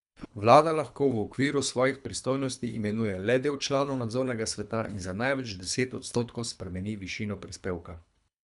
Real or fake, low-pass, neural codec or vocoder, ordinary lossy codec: fake; 10.8 kHz; codec, 24 kHz, 3 kbps, HILCodec; none